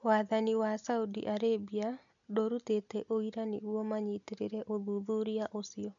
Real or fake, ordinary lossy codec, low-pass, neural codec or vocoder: real; none; 7.2 kHz; none